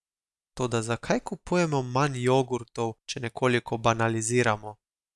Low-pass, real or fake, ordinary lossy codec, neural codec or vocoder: none; real; none; none